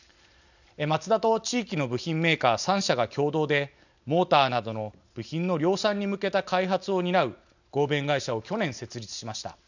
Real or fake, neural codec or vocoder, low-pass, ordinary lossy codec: real; none; 7.2 kHz; none